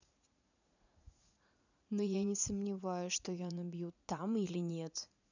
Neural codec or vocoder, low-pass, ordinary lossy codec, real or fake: vocoder, 44.1 kHz, 80 mel bands, Vocos; 7.2 kHz; none; fake